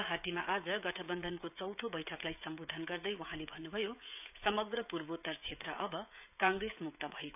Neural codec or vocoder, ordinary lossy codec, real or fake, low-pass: codec, 16 kHz, 16 kbps, FreqCodec, smaller model; none; fake; 3.6 kHz